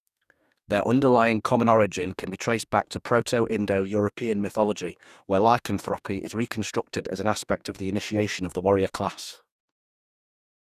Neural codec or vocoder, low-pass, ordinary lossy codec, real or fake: codec, 44.1 kHz, 2.6 kbps, DAC; 14.4 kHz; none; fake